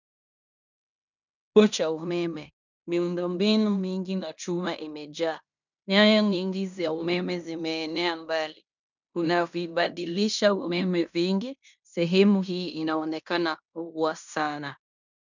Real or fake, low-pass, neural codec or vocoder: fake; 7.2 kHz; codec, 16 kHz in and 24 kHz out, 0.9 kbps, LongCat-Audio-Codec, fine tuned four codebook decoder